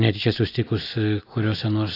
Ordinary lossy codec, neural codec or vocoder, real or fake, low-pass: AAC, 24 kbps; none; real; 5.4 kHz